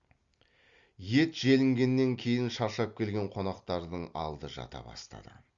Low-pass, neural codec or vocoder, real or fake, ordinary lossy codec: 7.2 kHz; none; real; Opus, 64 kbps